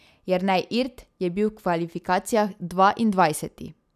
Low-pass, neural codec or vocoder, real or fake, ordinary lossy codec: 14.4 kHz; none; real; none